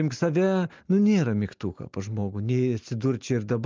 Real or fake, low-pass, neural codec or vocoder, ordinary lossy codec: real; 7.2 kHz; none; Opus, 24 kbps